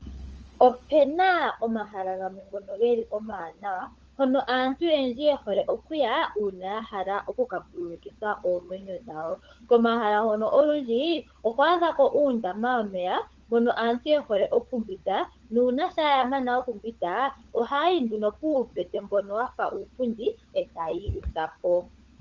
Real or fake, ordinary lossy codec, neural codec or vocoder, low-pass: fake; Opus, 24 kbps; codec, 16 kHz, 16 kbps, FunCodec, trained on LibriTTS, 50 frames a second; 7.2 kHz